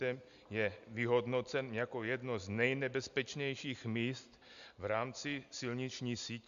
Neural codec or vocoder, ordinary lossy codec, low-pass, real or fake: none; AAC, 96 kbps; 7.2 kHz; real